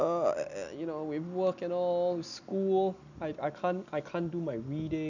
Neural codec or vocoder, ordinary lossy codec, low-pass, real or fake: none; none; 7.2 kHz; real